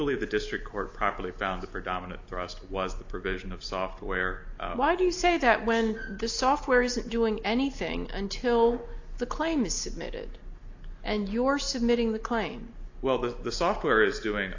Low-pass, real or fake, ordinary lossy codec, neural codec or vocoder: 7.2 kHz; real; AAC, 48 kbps; none